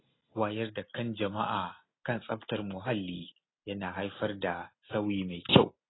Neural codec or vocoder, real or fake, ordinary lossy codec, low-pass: none; real; AAC, 16 kbps; 7.2 kHz